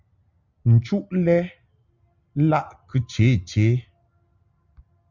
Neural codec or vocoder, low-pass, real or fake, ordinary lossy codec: none; 7.2 kHz; real; Opus, 64 kbps